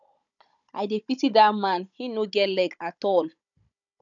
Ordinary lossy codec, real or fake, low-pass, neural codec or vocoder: none; fake; 7.2 kHz; codec, 16 kHz, 16 kbps, FunCodec, trained on Chinese and English, 50 frames a second